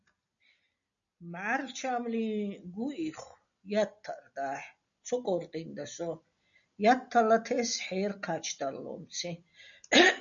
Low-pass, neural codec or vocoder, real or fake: 7.2 kHz; none; real